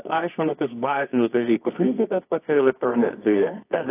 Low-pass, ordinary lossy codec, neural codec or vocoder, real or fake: 3.6 kHz; AAC, 24 kbps; codec, 24 kHz, 0.9 kbps, WavTokenizer, medium music audio release; fake